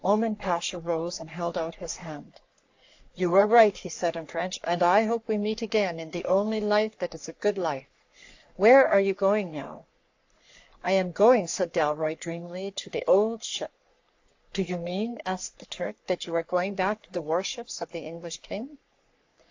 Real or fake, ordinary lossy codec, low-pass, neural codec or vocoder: fake; AAC, 48 kbps; 7.2 kHz; codec, 44.1 kHz, 3.4 kbps, Pupu-Codec